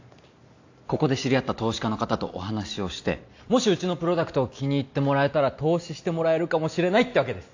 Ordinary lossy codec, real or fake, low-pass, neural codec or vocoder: AAC, 48 kbps; real; 7.2 kHz; none